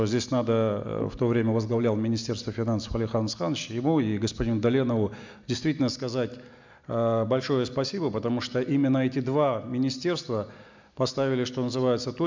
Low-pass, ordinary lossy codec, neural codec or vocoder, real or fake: 7.2 kHz; MP3, 64 kbps; none; real